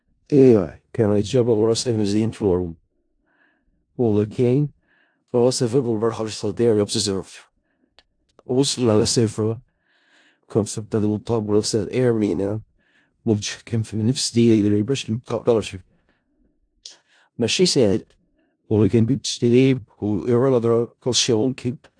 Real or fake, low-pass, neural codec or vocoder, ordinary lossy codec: fake; 9.9 kHz; codec, 16 kHz in and 24 kHz out, 0.4 kbps, LongCat-Audio-Codec, four codebook decoder; AAC, 64 kbps